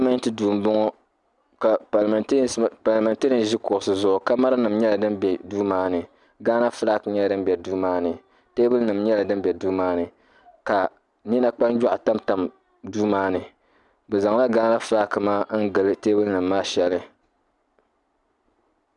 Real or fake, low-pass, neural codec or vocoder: real; 10.8 kHz; none